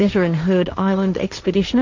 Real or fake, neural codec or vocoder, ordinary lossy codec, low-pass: fake; codec, 16 kHz, 1.1 kbps, Voila-Tokenizer; MP3, 64 kbps; 7.2 kHz